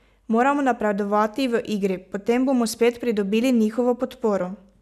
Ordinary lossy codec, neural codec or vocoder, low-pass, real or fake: none; none; 14.4 kHz; real